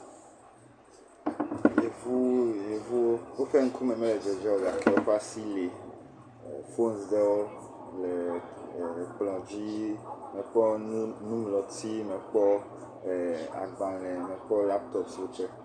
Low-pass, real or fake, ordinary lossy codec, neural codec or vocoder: 9.9 kHz; real; Opus, 64 kbps; none